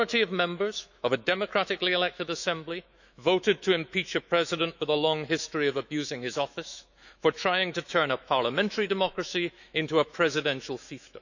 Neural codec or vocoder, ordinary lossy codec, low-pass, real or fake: autoencoder, 48 kHz, 128 numbers a frame, DAC-VAE, trained on Japanese speech; none; 7.2 kHz; fake